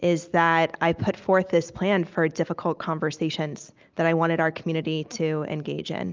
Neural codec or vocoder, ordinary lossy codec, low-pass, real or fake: none; Opus, 24 kbps; 7.2 kHz; real